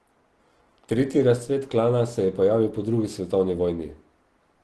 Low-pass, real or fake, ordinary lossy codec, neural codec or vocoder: 10.8 kHz; real; Opus, 16 kbps; none